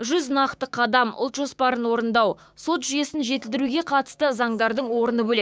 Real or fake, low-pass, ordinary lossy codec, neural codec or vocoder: fake; none; none; codec, 16 kHz, 6 kbps, DAC